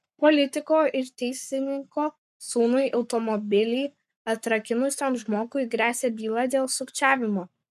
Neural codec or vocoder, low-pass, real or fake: codec, 44.1 kHz, 7.8 kbps, Pupu-Codec; 14.4 kHz; fake